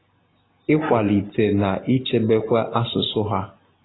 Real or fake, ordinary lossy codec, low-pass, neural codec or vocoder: real; AAC, 16 kbps; 7.2 kHz; none